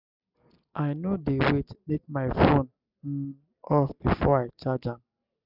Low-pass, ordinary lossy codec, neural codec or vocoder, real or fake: 5.4 kHz; none; none; real